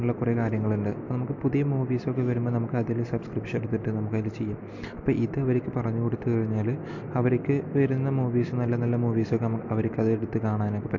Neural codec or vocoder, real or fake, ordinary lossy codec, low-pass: none; real; MP3, 48 kbps; 7.2 kHz